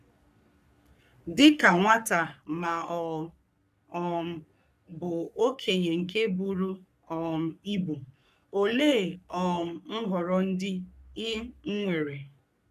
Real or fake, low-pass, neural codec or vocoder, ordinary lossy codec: fake; 14.4 kHz; codec, 44.1 kHz, 3.4 kbps, Pupu-Codec; none